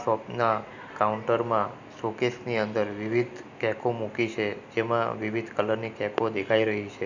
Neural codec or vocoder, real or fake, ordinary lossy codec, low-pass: none; real; none; 7.2 kHz